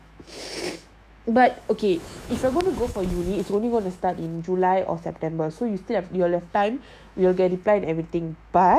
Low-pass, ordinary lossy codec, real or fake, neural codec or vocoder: 14.4 kHz; MP3, 96 kbps; fake; autoencoder, 48 kHz, 128 numbers a frame, DAC-VAE, trained on Japanese speech